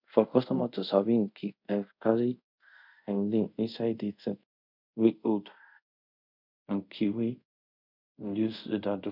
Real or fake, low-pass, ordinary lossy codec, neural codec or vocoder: fake; 5.4 kHz; none; codec, 24 kHz, 0.5 kbps, DualCodec